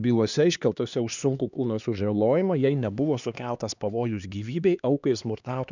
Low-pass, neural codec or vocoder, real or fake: 7.2 kHz; codec, 16 kHz, 2 kbps, X-Codec, HuBERT features, trained on LibriSpeech; fake